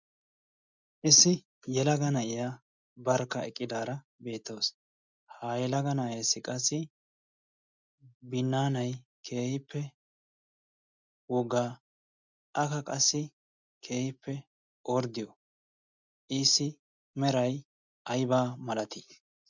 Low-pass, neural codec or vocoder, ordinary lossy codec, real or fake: 7.2 kHz; none; MP3, 64 kbps; real